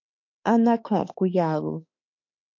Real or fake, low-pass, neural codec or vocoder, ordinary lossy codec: fake; 7.2 kHz; autoencoder, 48 kHz, 32 numbers a frame, DAC-VAE, trained on Japanese speech; MP3, 48 kbps